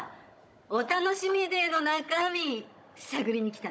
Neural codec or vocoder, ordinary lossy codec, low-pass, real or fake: codec, 16 kHz, 16 kbps, FunCodec, trained on Chinese and English, 50 frames a second; none; none; fake